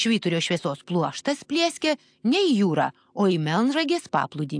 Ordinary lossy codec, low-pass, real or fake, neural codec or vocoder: AAC, 64 kbps; 9.9 kHz; real; none